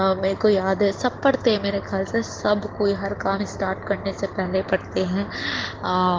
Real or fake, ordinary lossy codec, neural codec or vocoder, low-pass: real; Opus, 16 kbps; none; 7.2 kHz